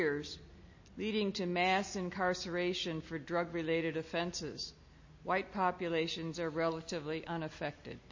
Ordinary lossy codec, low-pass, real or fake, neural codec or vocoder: MP3, 32 kbps; 7.2 kHz; real; none